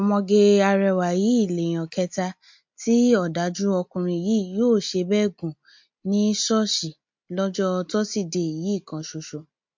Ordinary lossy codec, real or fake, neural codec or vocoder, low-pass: MP3, 48 kbps; real; none; 7.2 kHz